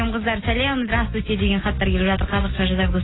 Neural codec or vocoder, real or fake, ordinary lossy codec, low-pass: none; real; AAC, 16 kbps; 7.2 kHz